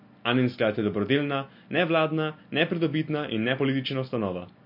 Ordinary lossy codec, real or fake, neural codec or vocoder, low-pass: MP3, 32 kbps; real; none; 5.4 kHz